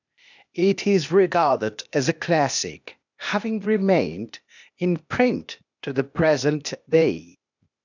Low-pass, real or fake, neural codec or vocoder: 7.2 kHz; fake; codec, 16 kHz, 0.8 kbps, ZipCodec